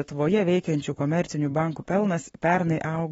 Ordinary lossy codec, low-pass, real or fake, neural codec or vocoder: AAC, 24 kbps; 19.8 kHz; fake; codec, 44.1 kHz, 7.8 kbps, Pupu-Codec